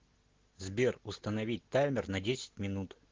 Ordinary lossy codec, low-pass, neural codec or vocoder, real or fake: Opus, 16 kbps; 7.2 kHz; none; real